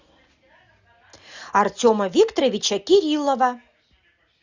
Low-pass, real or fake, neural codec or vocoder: 7.2 kHz; real; none